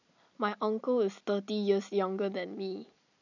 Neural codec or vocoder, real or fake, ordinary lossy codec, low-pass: none; real; none; 7.2 kHz